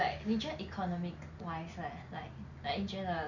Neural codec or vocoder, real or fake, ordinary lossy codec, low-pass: none; real; none; 7.2 kHz